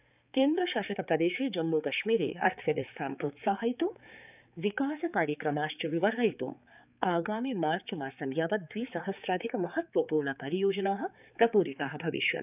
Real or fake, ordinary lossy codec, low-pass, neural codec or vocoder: fake; none; 3.6 kHz; codec, 16 kHz, 4 kbps, X-Codec, HuBERT features, trained on balanced general audio